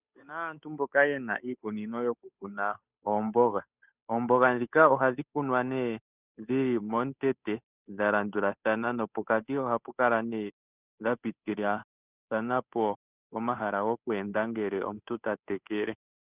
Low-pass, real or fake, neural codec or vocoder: 3.6 kHz; fake; codec, 16 kHz, 8 kbps, FunCodec, trained on Chinese and English, 25 frames a second